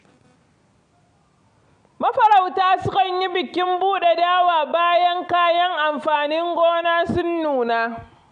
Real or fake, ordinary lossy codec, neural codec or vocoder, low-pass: real; MP3, 64 kbps; none; 9.9 kHz